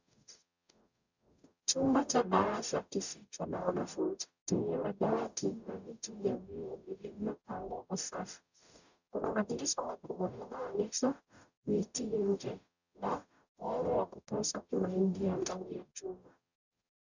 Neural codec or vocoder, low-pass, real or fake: codec, 44.1 kHz, 0.9 kbps, DAC; 7.2 kHz; fake